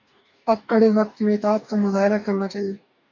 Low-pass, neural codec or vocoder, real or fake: 7.2 kHz; codec, 44.1 kHz, 2.6 kbps, DAC; fake